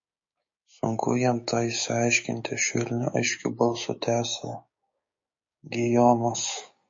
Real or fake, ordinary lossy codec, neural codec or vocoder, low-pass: fake; MP3, 32 kbps; codec, 16 kHz, 6 kbps, DAC; 7.2 kHz